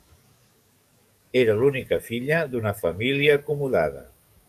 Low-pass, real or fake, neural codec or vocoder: 14.4 kHz; fake; codec, 44.1 kHz, 7.8 kbps, DAC